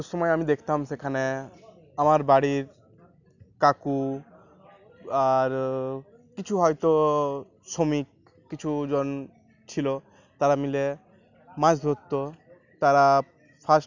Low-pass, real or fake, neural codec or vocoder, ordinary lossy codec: 7.2 kHz; real; none; none